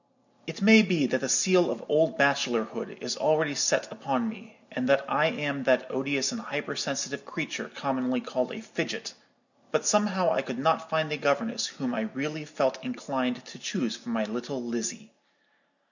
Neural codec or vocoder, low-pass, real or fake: none; 7.2 kHz; real